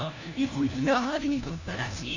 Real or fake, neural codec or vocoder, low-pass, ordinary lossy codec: fake; codec, 16 kHz, 1 kbps, FunCodec, trained on LibriTTS, 50 frames a second; 7.2 kHz; none